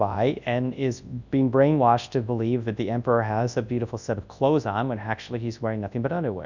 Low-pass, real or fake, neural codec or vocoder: 7.2 kHz; fake; codec, 24 kHz, 0.9 kbps, WavTokenizer, large speech release